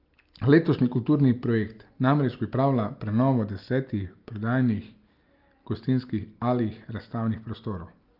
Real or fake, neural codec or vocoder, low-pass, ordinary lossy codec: real; none; 5.4 kHz; Opus, 24 kbps